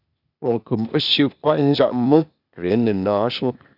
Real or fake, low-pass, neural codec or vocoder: fake; 5.4 kHz; codec, 16 kHz, 0.8 kbps, ZipCodec